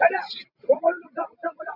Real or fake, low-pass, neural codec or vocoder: real; 5.4 kHz; none